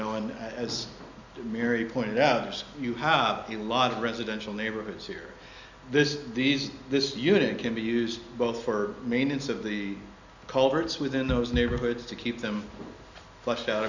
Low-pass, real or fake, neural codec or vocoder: 7.2 kHz; real; none